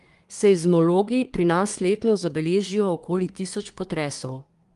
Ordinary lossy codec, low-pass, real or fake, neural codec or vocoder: Opus, 32 kbps; 10.8 kHz; fake; codec, 24 kHz, 1 kbps, SNAC